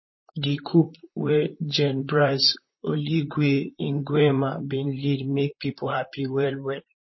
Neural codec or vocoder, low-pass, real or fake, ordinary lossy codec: vocoder, 44.1 kHz, 128 mel bands, Pupu-Vocoder; 7.2 kHz; fake; MP3, 24 kbps